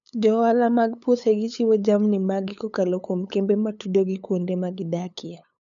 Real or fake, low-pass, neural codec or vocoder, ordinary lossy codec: fake; 7.2 kHz; codec, 16 kHz, 8 kbps, FunCodec, trained on LibriTTS, 25 frames a second; none